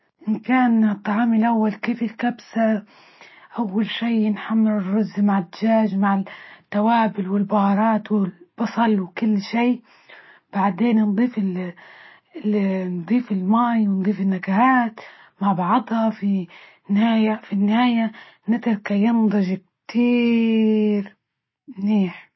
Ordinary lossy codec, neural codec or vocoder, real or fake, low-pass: MP3, 24 kbps; none; real; 7.2 kHz